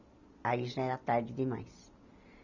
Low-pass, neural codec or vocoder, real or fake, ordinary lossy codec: 7.2 kHz; none; real; none